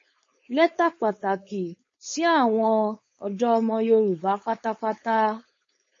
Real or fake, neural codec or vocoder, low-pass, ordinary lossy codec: fake; codec, 16 kHz, 4.8 kbps, FACodec; 7.2 kHz; MP3, 32 kbps